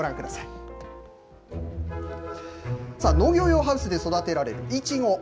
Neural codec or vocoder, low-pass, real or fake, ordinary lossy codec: none; none; real; none